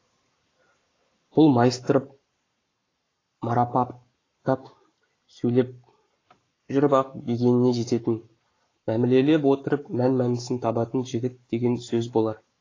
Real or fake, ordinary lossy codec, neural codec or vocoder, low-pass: fake; AAC, 32 kbps; codec, 44.1 kHz, 7.8 kbps, Pupu-Codec; 7.2 kHz